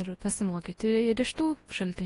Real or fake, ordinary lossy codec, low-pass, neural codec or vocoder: fake; AAC, 32 kbps; 10.8 kHz; codec, 24 kHz, 0.9 kbps, WavTokenizer, large speech release